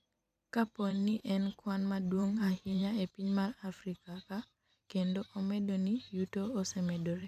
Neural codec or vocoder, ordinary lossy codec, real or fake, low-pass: vocoder, 44.1 kHz, 128 mel bands every 512 samples, BigVGAN v2; AAC, 96 kbps; fake; 14.4 kHz